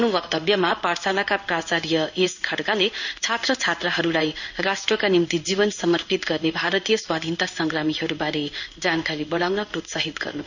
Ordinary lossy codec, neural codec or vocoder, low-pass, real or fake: none; codec, 16 kHz in and 24 kHz out, 1 kbps, XY-Tokenizer; 7.2 kHz; fake